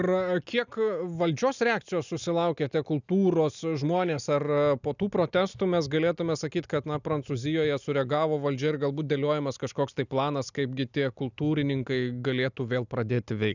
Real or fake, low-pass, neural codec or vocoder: real; 7.2 kHz; none